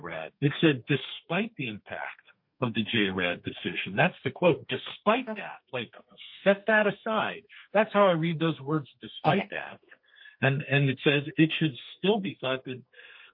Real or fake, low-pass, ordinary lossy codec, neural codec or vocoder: fake; 5.4 kHz; MP3, 32 kbps; codec, 32 kHz, 1.9 kbps, SNAC